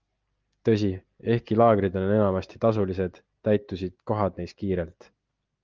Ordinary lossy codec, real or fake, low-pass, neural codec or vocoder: Opus, 32 kbps; real; 7.2 kHz; none